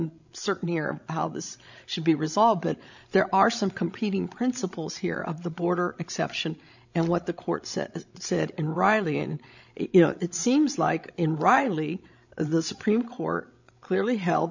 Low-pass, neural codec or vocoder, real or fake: 7.2 kHz; codec, 16 kHz, 16 kbps, FreqCodec, larger model; fake